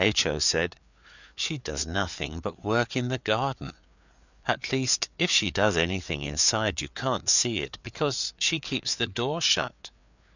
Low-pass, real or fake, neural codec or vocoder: 7.2 kHz; fake; codec, 16 kHz, 4 kbps, FreqCodec, larger model